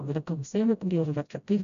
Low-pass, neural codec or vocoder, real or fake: 7.2 kHz; codec, 16 kHz, 0.5 kbps, FreqCodec, smaller model; fake